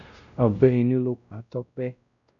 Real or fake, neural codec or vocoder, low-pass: fake; codec, 16 kHz, 0.5 kbps, X-Codec, WavLM features, trained on Multilingual LibriSpeech; 7.2 kHz